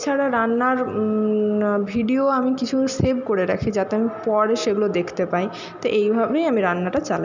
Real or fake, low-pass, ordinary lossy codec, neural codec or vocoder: real; 7.2 kHz; none; none